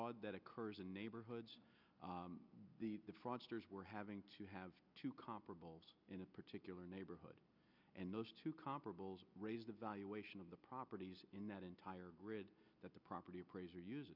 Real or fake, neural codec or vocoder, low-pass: real; none; 5.4 kHz